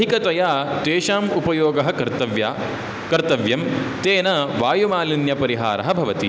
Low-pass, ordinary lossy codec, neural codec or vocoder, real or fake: none; none; none; real